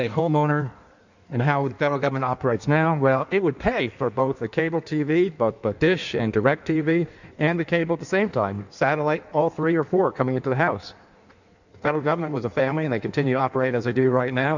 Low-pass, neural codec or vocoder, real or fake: 7.2 kHz; codec, 16 kHz in and 24 kHz out, 1.1 kbps, FireRedTTS-2 codec; fake